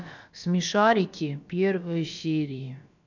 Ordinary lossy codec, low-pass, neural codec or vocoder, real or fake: none; 7.2 kHz; codec, 16 kHz, about 1 kbps, DyCAST, with the encoder's durations; fake